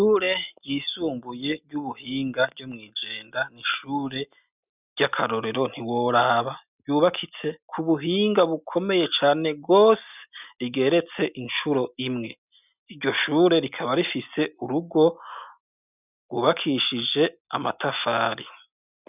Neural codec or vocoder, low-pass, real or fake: none; 3.6 kHz; real